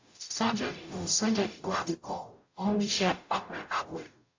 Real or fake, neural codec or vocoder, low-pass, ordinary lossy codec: fake; codec, 44.1 kHz, 0.9 kbps, DAC; 7.2 kHz; AAC, 48 kbps